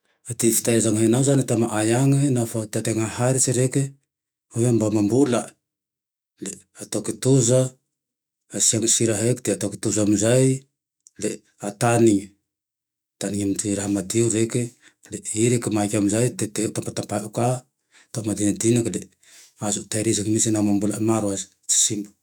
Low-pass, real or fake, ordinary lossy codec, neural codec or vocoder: none; fake; none; autoencoder, 48 kHz, 128 numbers a frame, DAC-VAE, trained on Japanese speech